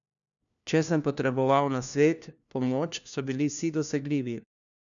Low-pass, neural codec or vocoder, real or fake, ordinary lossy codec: 7.2 kHz; codec, 16 kHz, 1 kbps, FunCodec, trained on LibriTTS, 50 frames a second; fake; none